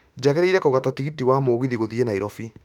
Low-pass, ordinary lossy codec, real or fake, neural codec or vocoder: 19.8 kHz; none; fake; autoencoder, 48 kHz, 32 numbers a frame, DAC-VAE, trained on Japanese speech